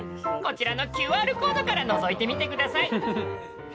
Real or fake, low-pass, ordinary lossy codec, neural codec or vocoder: real; none; none; none